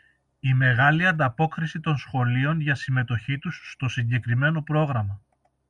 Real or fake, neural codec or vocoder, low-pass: real; none; 10.8 kHz